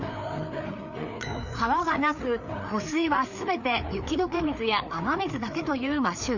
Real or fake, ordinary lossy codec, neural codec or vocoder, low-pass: fake; none; codec, 16 kHz, 4 kbps, FreqCodec, larger model; 7.2 kHz